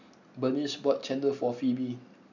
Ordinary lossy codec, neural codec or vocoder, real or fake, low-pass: none; none; real; 7.2 kHz